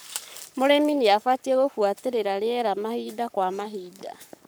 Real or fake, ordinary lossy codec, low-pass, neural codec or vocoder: fake; none; none; codec, 44.1 kHz, 7.8 kbps, Pupu-Codec